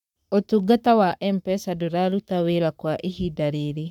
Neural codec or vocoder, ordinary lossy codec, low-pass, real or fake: codec, 44.1 kHz, 7.8 kbps, Pupu-Codec; none; 19.8 kHz; fake